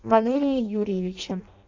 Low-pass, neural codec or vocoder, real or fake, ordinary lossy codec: 7.2 kHz; codec, 16 kHz in and 24 kHz out, 0.6 kbps, FireRedTTS-2 codec; fake; AAC, 48 kbps